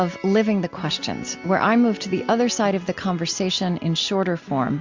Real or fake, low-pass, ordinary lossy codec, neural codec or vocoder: real; 7.2 kHz; MP3, 64 kbps; none